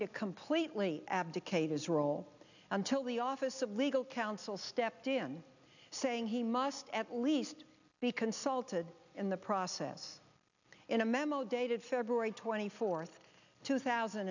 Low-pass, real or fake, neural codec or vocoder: 7.2 kHz; real; none